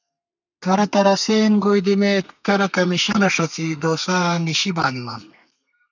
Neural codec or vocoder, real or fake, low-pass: codec, 32 kHz, 1.9 kbps, SNAC; fake; 7.2 kHz